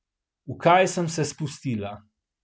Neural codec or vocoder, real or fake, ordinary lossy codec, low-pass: none; real; none; none